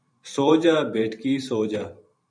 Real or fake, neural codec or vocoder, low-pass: fake; vocoder, 44.1 kHz, 128 mel bands every 512 samples, BigVGAN v2; 9.9 kHz